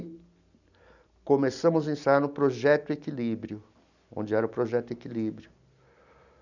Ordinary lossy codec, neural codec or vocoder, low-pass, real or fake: none; none; 7.2 kHz; real